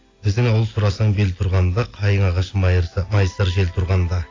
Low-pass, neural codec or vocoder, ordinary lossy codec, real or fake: 7.2 kHz; none; AAC, 32 kbps; real